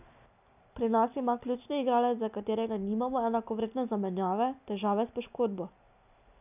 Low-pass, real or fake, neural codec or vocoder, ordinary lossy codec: 3.6 kHz; real; none; none